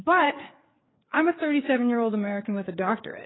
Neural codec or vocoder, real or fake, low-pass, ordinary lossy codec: codec, 16 kHz, 4 kbps, FreqCodec, larger model; fake; 7.2 kHz; AAC, 16 kbps